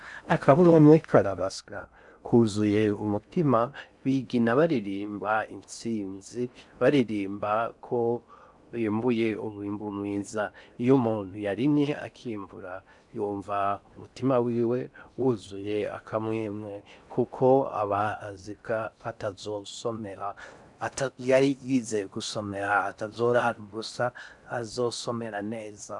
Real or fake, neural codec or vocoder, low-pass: fake; codec, 16 kHz in and 24 kHz out, 0.6 kbps, FocalCodec, streaming, 4096 codes; 10.8 kHz